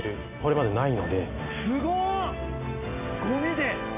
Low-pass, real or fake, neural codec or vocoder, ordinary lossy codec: 3.6 kHz; real; none; none